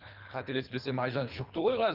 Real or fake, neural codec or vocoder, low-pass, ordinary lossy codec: fake; codec, 24 kHz, 3 kbps, HILCodec; 5.4 kHz; Opus, 24 kbps